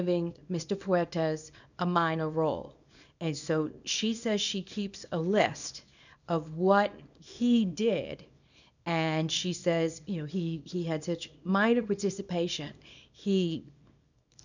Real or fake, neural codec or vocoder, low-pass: fake; codec, 24 kHz, 0.9 kbps, WavTokenizer, small release; 7.2 kHz